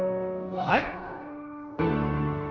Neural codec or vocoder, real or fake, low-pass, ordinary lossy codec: codec, 16 kHz in and 24 kHz out, 0.9 kbps, LongCat-Audio-Codec, fine tuned four codebook decoder; fake; 7.2 kHz; Opus, 64 kbps